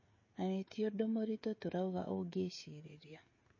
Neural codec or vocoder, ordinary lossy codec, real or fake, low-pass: codec, 16 kHz, 16 kbps, FreqCodec, smaller model; MP3, 32 kbps; fake; 7.2 kHz